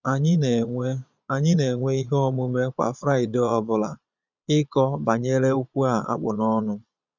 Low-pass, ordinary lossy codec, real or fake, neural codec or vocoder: 7.2 kHz; none; fake; vocoder, 22.05 kHz, 80 mel bands, Vocos